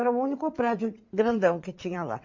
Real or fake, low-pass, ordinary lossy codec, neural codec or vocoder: fake; 7.2 kHz; MP3, 48 kbps; vocoder, 22.05 kHz, 80 mel bands, WaveNeXt